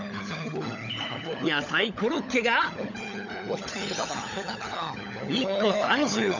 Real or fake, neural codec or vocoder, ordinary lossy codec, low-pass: fake; codec, 16 kHz, 16 kbps, FunCodec, trained on LibriTTS, 50 frames a second; none; 7.2 kHz